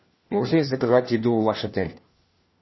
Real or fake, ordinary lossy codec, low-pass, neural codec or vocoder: fake; MP3, 24 kbps; 7.2 kHz; codec, 16 kHz, 1 kbps, FunCodec, trained on LibriTTS, 50 frames a second